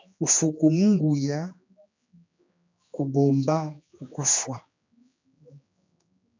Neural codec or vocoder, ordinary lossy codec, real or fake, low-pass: codec, 16 kHz, 2 kbps, X-Codec, HuBERT features, trained on general audio; MP3, 64 kbps; fake; 7.2 kHz